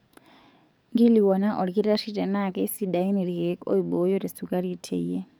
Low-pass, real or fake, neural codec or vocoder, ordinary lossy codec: none; fake; codec, 44.1 kHz, 7.8 kbps, DAC; none